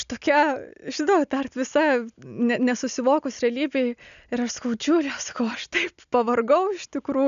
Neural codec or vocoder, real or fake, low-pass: none; real; 7.2 kHz